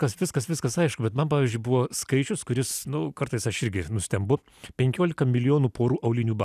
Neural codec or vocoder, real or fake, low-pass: none; real; 14.4 kHz